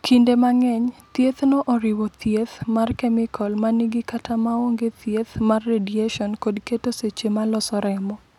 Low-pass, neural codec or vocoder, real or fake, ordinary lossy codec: 19.8 kHz; none; real; none